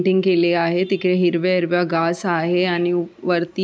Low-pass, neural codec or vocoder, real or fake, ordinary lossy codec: none; none; real; none